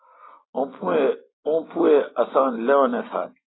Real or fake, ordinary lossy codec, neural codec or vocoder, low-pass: real; AAC, 16 kbps; none; 7.2 kHz